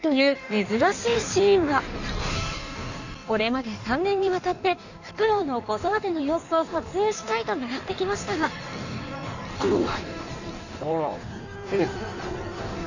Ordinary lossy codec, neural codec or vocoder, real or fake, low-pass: none; codec, 16 kHz in and 24 kHz out, 1.1 kbps, FireRedTTS-2 codec; fake; 7.2 kHz